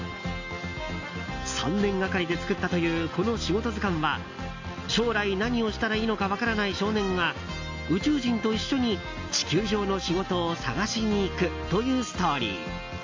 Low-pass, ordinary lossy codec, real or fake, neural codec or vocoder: 7.2 kHz; AAC, 48 kbps; real; none